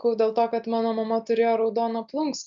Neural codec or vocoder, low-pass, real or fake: none; 7.2 kHz; real